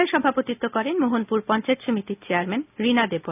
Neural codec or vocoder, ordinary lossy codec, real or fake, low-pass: none; none; real; 3.6 kHz